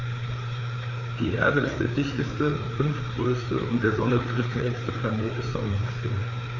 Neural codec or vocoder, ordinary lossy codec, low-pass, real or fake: codec, 16 kHz, 4 kbps, FreqCodec, larger model; none; 7.2 kHz; fake